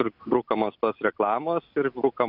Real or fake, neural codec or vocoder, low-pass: real; none; 5.4 kHz